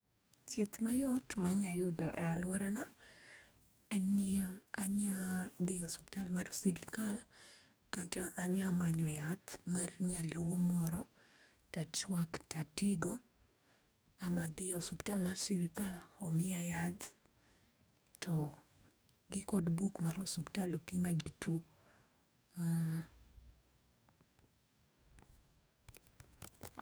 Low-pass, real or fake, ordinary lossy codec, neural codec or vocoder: none; fake; none; codec, 44.1 kHz, 2.6 kbps, DAC